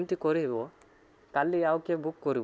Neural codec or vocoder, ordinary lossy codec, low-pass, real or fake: none; none; none; real